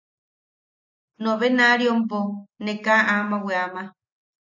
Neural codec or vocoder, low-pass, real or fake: none; 7.2 kHz; real